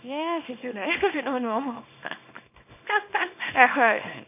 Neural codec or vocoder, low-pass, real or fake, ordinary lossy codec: codec, 24 kHz, 0.9 kbps, WavTokenizer, small release; 3.6 kHz; fake; none